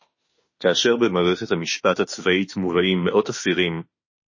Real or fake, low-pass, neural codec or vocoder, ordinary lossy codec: fake; 7.2 kHz; autoencoder, 48 kHz, 32 numbers a frame, DAC-VAE, trained on Japanese speech; MP3, 32 kbps